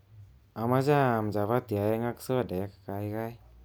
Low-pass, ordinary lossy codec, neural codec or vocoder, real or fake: none; none; none; real